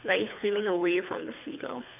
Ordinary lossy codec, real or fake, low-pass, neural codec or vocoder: MP3, 32 kbps; fake; 3.6 kHz; codec, 24 kHz, 3 kbps, HILCodec